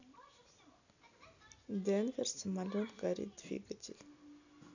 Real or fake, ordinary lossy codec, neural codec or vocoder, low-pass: real; none; none; 7.2 kHz